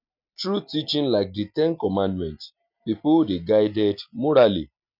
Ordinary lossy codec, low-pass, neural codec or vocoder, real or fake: none; 5.4 kHz; none; real